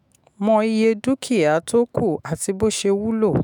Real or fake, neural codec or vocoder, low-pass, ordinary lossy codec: fake; autoencoder, 48 kHz, 128 numbers a frame, DAC-VAE, trained on Japanese speech; 19.8 kHz; none